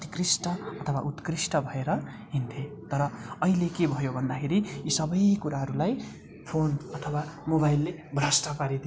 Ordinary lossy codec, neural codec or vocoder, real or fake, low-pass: none; none; real; none